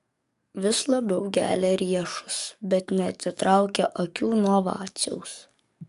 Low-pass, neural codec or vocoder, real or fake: 14.4 kHz; codec, 44.1 kHz, 7.8 kbps, DAC; fake